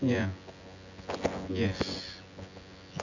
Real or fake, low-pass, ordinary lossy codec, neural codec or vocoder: fake; 7.2 kHz; none; vocoder, 24 kHz, 100 mel bands, Vocos